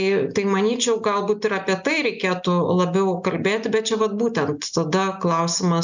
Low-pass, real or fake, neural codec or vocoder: 7.2 kHz; real; none